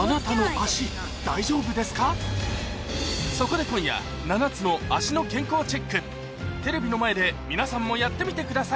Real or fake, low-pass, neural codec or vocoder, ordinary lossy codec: real; none; none; none